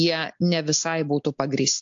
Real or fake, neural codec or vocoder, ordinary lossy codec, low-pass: real; none; AAC, 64 kbps; 7.2 kHz